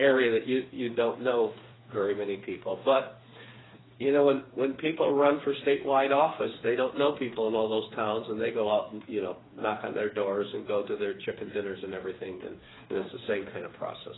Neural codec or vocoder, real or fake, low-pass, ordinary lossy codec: codec, 16 kHz, 4 kbps, FreqCodec, smaller model; fake; 7.2 kHz; AAC, 16 kbps